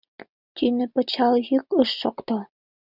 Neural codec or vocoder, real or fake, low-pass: none; real; 5.4 kHz